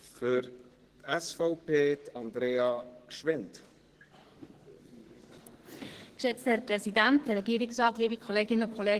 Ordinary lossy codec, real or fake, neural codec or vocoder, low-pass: Opus, 16 kbps; fake; codec, 44.1 kHz, 2.6 kbps, SNAC; 14.4 kHz